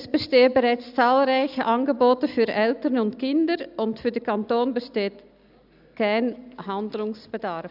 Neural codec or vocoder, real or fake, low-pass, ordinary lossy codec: none; real; 5.4 kHz; none